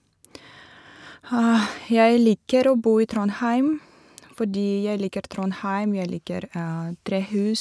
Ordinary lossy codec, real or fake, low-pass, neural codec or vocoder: none; real; none; none